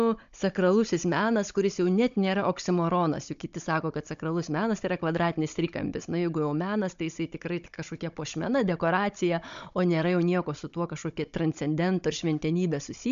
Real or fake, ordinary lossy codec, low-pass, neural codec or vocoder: fake; MP3, 64 kbps; 7.2 kHz; codec, 16 kHz, 16 kbps, FunCodec, trained on Chinese and English, 50 frames a second